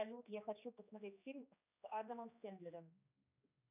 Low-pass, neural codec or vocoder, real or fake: 3.6 kHz; codec, 16 kHz, 2 kbps, X-Codec, HuBERT features, trained on general audio; fake